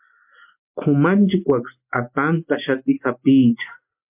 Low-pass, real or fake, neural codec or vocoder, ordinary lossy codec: 3.6 kHz; real; none; MP3, 32 kbps